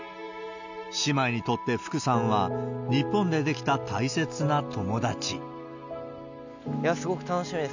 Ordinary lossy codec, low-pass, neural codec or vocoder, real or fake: none; 7.2 kHz; none; real